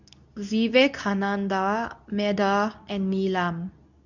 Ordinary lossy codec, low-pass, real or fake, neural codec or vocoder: none; 7.2 kHz; fake; codec, 24 kHz, 0.9 kbps, WavTokenizer, medium speech release version 2